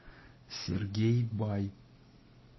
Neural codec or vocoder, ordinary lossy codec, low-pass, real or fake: none; MP3, 24 kbps; 7.2 kHz; real